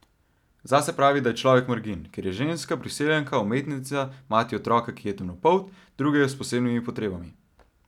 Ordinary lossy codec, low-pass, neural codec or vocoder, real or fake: none; 19.8 kHz; none; real